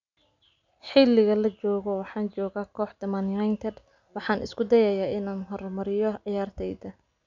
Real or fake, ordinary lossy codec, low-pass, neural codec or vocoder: real; none; 7.2 kHz; none